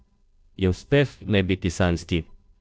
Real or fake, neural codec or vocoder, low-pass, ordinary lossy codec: fake; codec, 16 kHz, 0.5 kbps, FunCodec, trained on Chinese and English, 25 frames a second; none; none